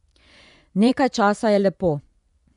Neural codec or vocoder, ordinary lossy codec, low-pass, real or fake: vocoder, 24 kHz, 100 mel bands, Vocos; none; 10.8 kHz; fake